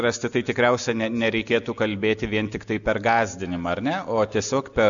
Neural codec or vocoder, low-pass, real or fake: none; 7.2 kHz; real